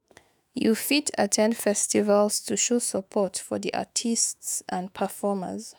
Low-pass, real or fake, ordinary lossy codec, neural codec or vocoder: none; fake; none; autoencoder, 48 kHz, 128 numbers a frame, DAC-VAE, trained on Japanese speech